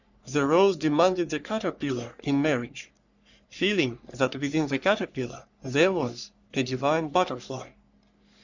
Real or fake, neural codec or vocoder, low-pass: fake; codec, 44.1 kHz, 3.4 kbps, Pupu-Codec; 7.2 kHz